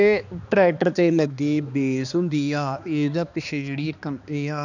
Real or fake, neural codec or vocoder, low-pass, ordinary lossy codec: fake; codec, 16 kHz, 2 kbps, X-Codec, HuBERT features, trained on balanced general audio; 7.2 kHz; none